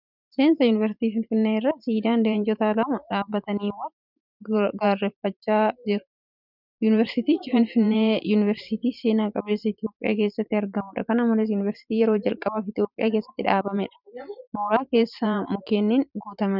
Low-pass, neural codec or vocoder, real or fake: 5.4 kHz; vocoder, 44.1 kHz, 128 mel bands every 512 samples, BigVGAN v2; fake